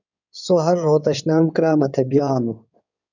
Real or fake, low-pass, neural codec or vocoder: fake; 7.2 kHz; codec, 16 kHz in and 24 kHz out, 2.2 kbps, FireRedTTS-2 codec